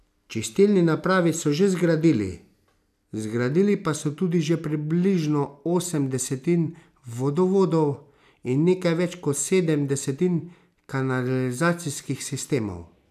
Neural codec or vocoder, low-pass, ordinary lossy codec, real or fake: none; 14.4 kHz; none; real